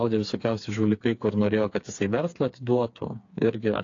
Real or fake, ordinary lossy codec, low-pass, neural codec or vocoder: fake; AAC, 48 kbps; 7.2 kHz; codec, 16 kHz, 4 kbps, FreqCodec, smaller model